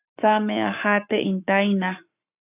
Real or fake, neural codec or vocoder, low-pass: fake; codec, 44.1 kHz, 7.8 kbps, Pupu-Codec; 3.6 kHz